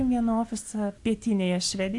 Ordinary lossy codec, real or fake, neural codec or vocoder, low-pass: AAC, 64 kbps; real; none; 10.8 kHz